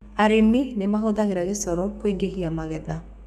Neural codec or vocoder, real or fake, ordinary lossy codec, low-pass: codec, 32 kHz, 1.9 kbps, SNAC; fake; none; 14.4 kHz